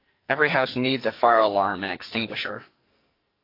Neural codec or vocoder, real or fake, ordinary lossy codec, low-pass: codec, 44.1 kHz, 2.6 kbps, SNAC; fake; AAC, 32 kbps; 5.4 kHz